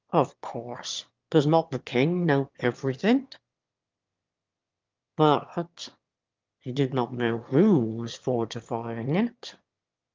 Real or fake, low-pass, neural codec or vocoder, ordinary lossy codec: fake; 7.2 kHz; autoencoder, 22.05 kHz, a latent of 192 numbers a frame, VITS, trained on one speaker; Opus, 24 kbps